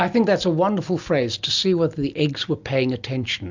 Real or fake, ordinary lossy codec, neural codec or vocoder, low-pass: real; MP3, 64 kbps; none; 7.2 kHz